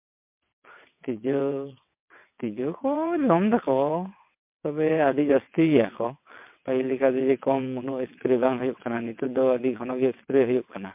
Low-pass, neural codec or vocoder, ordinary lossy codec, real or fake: 3.6 kHz; vocoder, 22.05 kHz, 80 mel bands, WaveNeXt; MP3, 32 kbps; fake